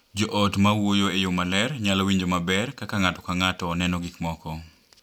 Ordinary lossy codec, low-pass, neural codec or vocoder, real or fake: none; 19.8 kHz; none; real